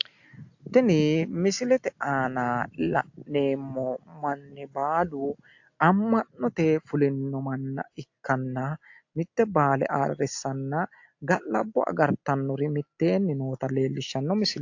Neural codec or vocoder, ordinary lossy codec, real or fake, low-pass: none; AAC, 48 kbps; real; 7.2 kHz